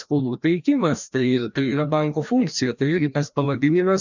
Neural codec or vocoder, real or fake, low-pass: codec, 16 kHz, 1 kbps, FreqCodec, larger model; fake; 7.2 kHz